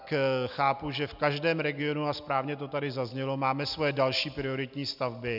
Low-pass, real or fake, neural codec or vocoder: 5.4 kHz; real; none